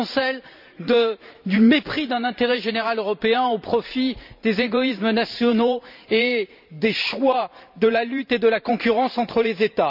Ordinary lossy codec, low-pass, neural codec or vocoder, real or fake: none; 5.4 kHz; vocoder, 44.1 kHz, 128 mel bands every 512 samples, BigVGAN v2; fake